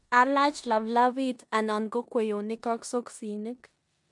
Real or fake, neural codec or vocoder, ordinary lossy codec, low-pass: fake; codec, 16 kHz in and 24 kHz out, 0.9 kbps, LongCat-Audio-Codec, four codebook decoder; MP3, 64 kbps; 10.8 kHz